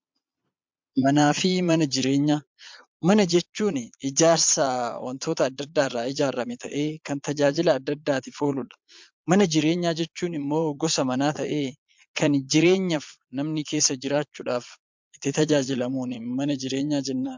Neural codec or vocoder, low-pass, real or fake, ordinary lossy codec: vocoder, 22.05 kHz, 80 mel bands, Vocos; 7.2 kHz; fake; MP3, 64 kbps